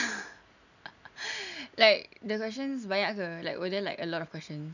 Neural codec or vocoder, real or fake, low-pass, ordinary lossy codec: none; real; 7.2 kHz; none